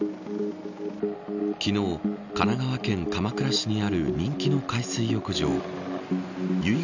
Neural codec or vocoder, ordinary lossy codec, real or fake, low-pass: none; none; real; 7.2 kHz